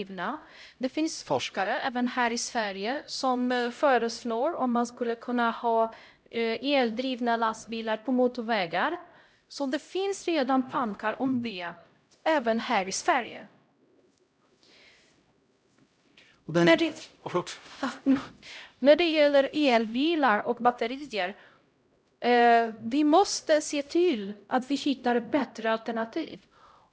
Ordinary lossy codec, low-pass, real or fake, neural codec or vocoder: none; none; fake; codec, 16 kHz, 0.5 kbps, X-Codec, HuBERT features, trained on LibriSpeech